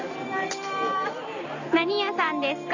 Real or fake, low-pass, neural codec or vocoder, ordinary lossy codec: real; 7.2 kHz; none; none